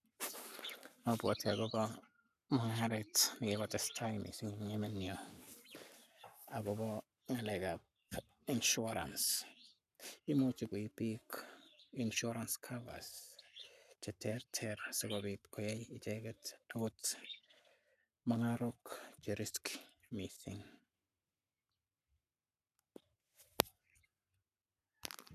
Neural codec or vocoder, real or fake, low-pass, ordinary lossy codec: codec, 44.1 kHz, 7.8 kbps, Pupu-Codec; fake; 14.4 kHz; none